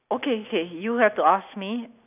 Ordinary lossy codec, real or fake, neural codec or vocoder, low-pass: none; real; none; 3.6 kHz